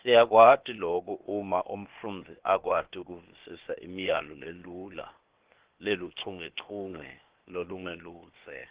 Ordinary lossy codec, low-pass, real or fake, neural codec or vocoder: Opus, 16 kbps; 3.6 kHz; fake; codec, 16 kHz, 0.8 kbps, ZipCodec